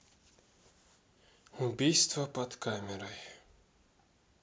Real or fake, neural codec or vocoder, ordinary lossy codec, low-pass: real; none; none; none